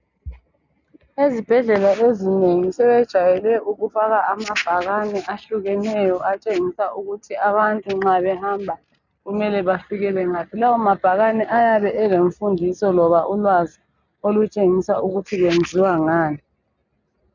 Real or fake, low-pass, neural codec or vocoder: real; 7.2 kHz; none